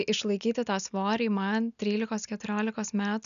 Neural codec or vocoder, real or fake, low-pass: none; real; 7.2 kHz